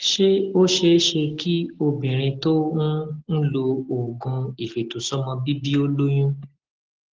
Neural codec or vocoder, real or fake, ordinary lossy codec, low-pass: none; real; Opus, 16 kbps; 7.2 kHz